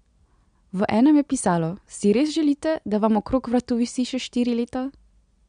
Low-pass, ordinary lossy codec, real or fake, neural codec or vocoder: 9.9 kHz; MP3, 64 kbps; real; none